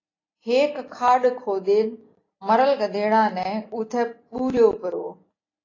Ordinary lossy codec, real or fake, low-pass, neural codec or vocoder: AAC, 32 kbps; real; 7.2 kHz; none